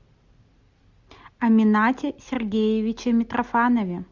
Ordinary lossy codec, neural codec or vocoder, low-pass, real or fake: Opus, 64 kbps; none; 7.2 kHz; real